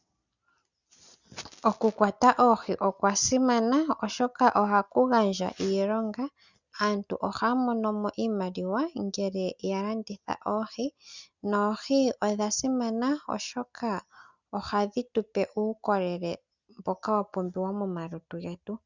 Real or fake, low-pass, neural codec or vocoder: real; 7.2 kHz; none